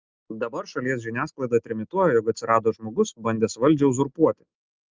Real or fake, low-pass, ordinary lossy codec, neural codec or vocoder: real; 7.2 kHz; Opus, 24 kbps; none